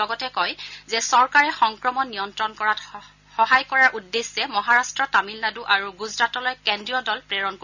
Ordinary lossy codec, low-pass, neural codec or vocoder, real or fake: none; 7.2 kHz; none; real